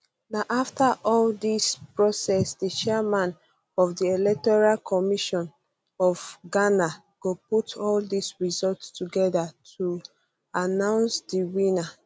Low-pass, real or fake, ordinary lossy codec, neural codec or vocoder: none; real; none; none